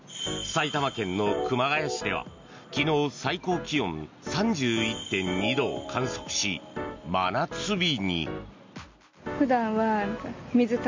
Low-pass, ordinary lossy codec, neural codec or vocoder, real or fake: 7.2 kHz; MP3, 64 kbps; none; real